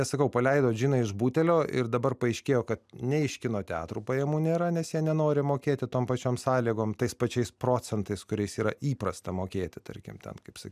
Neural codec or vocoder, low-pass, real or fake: none; 14.4 kHz; real